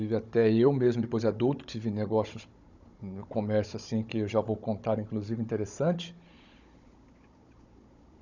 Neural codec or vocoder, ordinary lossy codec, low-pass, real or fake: codec, 16 kHz, 16 kbps, FunCodec, trained on Chinese and English, 50 frames a second; none; 7.2 kHz; fake